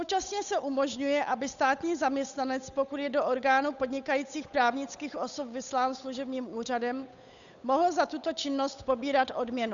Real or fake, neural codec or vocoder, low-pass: fake; codec, 16 kHz, 8 kbps, FunCodec, trained on Chinese and English, 25 frames a second; 7.2 kHz